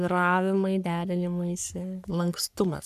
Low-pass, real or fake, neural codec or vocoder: 14.4 kHz; fake; codec, 44.1 kHz, 3.4 kbps, Pupu-Codec